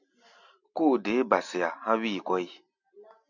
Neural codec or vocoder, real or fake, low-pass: none; real; 7.2 kHz